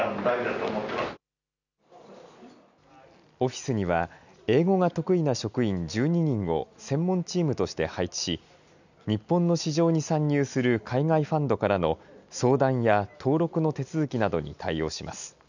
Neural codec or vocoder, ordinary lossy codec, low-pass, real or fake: none; none; 7.2 kHz; real